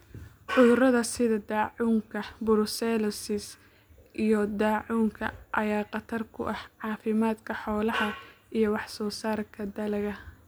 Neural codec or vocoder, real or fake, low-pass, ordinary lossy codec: none; real; none; none